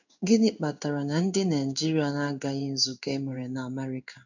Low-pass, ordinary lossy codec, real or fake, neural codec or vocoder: 7.2 kHz; none; fake; codec, 16 kHz in and 24 kHz out, 1 kbps, XY-Tokenizer